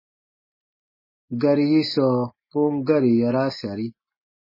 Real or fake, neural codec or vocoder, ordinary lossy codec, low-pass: real; none; MP3, 24 kbps; 5.4 kHz